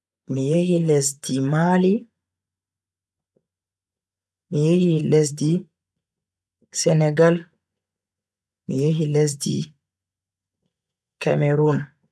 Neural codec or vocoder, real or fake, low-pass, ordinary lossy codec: vocoder, 24 kHz, 100 mel bands, Vocos; fake; none; none